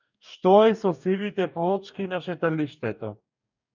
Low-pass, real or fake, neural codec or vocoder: 7.2 kHz; fake; codec, 44.1 kHz, 2.6 kbps, DAC